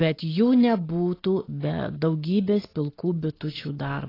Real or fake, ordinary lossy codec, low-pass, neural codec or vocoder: real; AAC, 24 kbps; 5.4 kHz; none